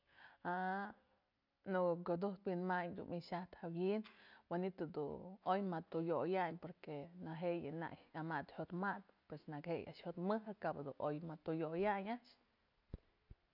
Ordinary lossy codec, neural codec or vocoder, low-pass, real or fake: none; none; 5.4 kHz; real